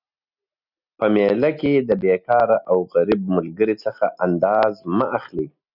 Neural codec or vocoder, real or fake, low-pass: none; real; 5.4 kHz